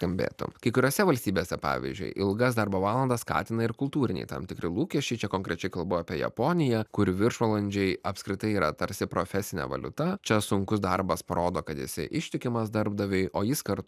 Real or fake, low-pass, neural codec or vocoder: real; 14.4 kHz; none